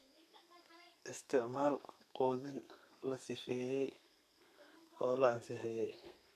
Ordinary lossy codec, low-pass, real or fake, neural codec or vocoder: none; 14.4 kHz; fake; codec, 32 kHz, 1.9 kbps, SNAC